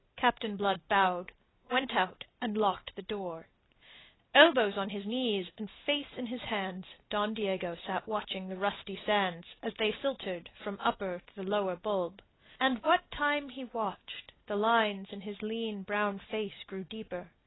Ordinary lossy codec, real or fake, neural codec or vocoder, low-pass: AAC, 16 kbps; real; none; 7.2 kHz